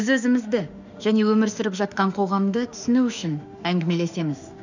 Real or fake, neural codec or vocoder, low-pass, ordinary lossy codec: fake; autoencoder, 48 kHz, 32 numbers a frame, DAC-VAE, trained on Japanese speech; 7.2 kHz; none